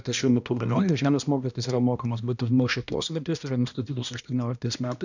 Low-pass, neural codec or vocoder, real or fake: 7.2 kHz; codec, 16 kHz, 1 kbps, X-Codec, HuBERT features, trained on balanced general audio; fake